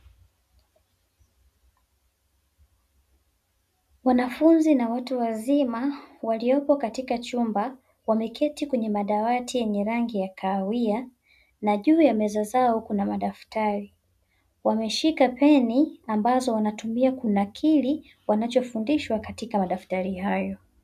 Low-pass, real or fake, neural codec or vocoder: 14.4 kHz; real; none